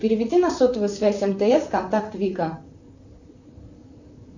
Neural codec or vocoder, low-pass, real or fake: vocoder, 44.1 kHz, 128 mel bands, Pupu-Vocoder; 7.2 kHz; fake